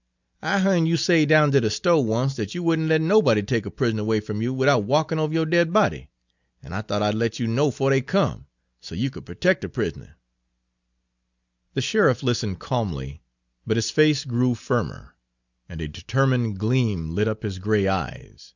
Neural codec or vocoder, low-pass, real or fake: none; 7.2 kHz; real